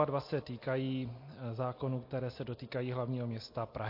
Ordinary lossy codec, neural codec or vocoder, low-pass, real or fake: MP3, 32 kbps; none; 5.4 kHz; real